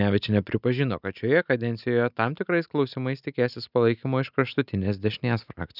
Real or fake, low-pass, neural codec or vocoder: real; 5.4 kHz; none